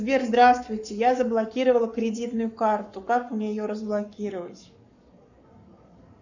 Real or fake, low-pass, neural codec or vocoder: fake; 7.2 kHz; codec, 44.1 kHz, 7.8 kbps, Pupu-Codec